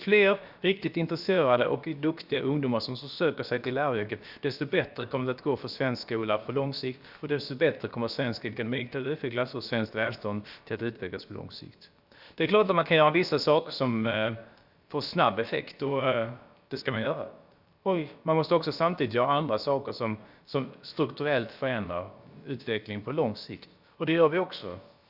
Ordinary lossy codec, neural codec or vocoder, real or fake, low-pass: Opus, 64 kbps; codec, 16 kHz, about 1 kbps, DyCAST, with the encoder's durations; fake; 5.4 kHz